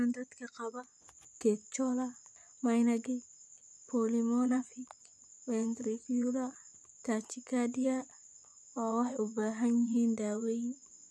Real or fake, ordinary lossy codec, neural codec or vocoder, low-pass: fake; none; vocoder, 24 kHz, 100 mel bands, Vocos; none